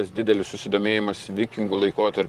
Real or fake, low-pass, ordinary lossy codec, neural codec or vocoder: fake; 14.4 kHz; Opus, 24 kbps; vocoder, 44.1 kHz, 128 mel bands, Pupu-Vocoder